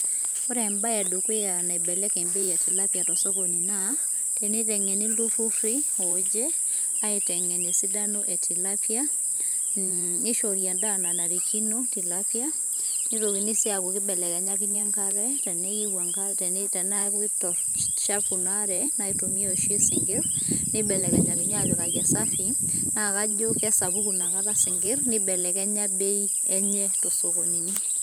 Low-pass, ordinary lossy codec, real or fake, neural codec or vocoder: none; none; fake; vocoder, 44.1 kHz, 128 mel bands every 512 samples, BigVGAN v2